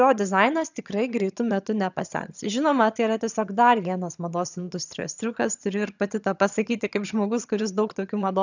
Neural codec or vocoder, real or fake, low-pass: vocoder, 22.05 kHz, 80 mel bands, HiFi-GAN; fake; 7.2 kHz